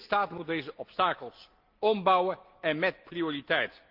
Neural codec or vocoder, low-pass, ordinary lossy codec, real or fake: none; 5.4 kHz; Opus, 32 kbps; real